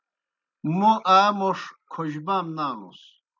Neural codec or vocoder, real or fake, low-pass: none; real; 7.2 kHz